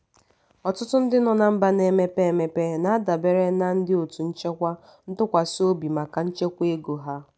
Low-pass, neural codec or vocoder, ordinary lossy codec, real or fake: none; none; none; real